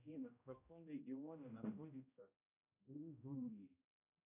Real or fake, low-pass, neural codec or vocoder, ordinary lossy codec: fake; 3.6 kHz; codec, 16 kHz, 0.5 kbps, X-Codec, HuBERT features, trained on general audio; MP3, 32 kbps